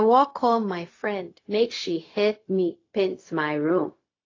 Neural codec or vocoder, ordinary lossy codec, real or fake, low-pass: codec, 16 kHz, 0.4 kbps, LongCat-Audio-Codec; AAC, 32 kbps; fake; 7.2 kHz